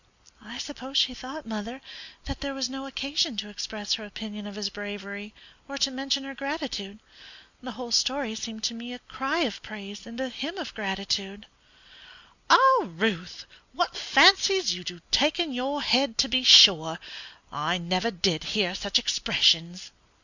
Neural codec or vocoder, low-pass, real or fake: none; 7.2 kHz; real